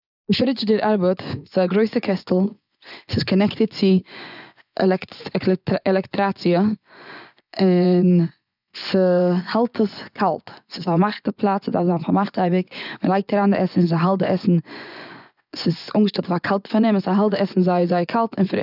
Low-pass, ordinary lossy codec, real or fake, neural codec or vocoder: 5.4 kHz; none; fake; vocoder, 24 kHz, 100 mel bands, Vocos